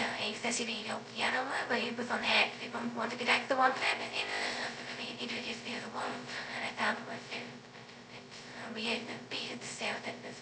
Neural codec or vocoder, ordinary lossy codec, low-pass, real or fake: codec, 16 kHz, 0.2 kbps, FocalCodec; none; none; fake